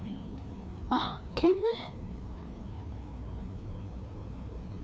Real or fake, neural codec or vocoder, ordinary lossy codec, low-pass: fake; codec, 16 kHz, 2 kbps, FreqCodec, larger model; none; none